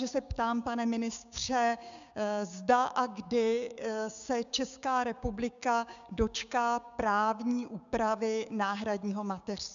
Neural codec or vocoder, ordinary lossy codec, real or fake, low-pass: codec, 16 kHz, 6 kbps, DAC; MP3, 96 kbps; fake; 7.2 kHz